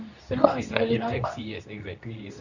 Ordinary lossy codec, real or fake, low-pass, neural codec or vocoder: none; fake; 7.2 kHz; codec, 24 kHz, 0.9 kbps, WavTokenizer, medium speech release version 2